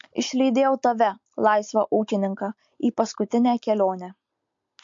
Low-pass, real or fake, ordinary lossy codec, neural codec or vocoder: 7.2 kHz; real; MP3, 48 kbps; none